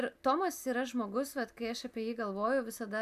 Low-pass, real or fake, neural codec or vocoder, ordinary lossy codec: 14.4 kHz; real; none; AAC, 96 kbps